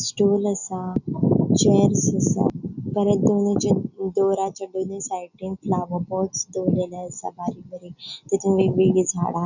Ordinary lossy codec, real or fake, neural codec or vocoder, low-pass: none; real; none; 7.2 kHz